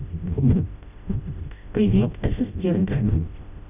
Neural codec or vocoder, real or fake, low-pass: codec, 16 kHz, 0.5 kbps, FreqCodec, smaller model; fake; 3.6 kHz